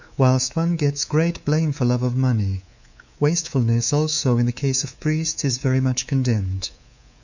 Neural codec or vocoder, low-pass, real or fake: codec, 24 kHz, 3.1 kbps, DualCodec; 7.2 kHz; fake